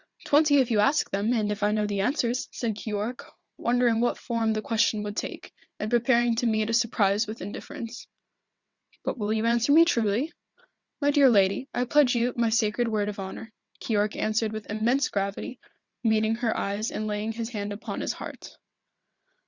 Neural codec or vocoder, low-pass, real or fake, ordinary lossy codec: vocoder, 22.05 kHz, 80 mel bands, WaveNeXt; 7.2 kHz; fake; Opus, 64 kbps